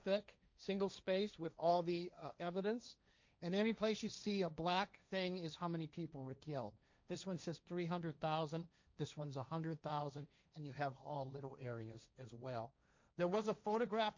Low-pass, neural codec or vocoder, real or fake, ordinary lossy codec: 7.2 kHz; codec, 16 kHz, 1.1 kbps, Voila-Tokenizer; fake; MP3, 64 kbps